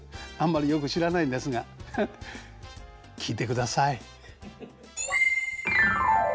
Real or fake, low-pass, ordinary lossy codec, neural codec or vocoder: real; none; none; none